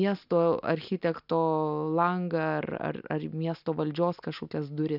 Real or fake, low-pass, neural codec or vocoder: real; 5.4 kHz; none